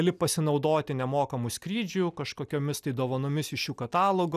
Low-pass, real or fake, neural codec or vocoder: 14.4 kHz; real; none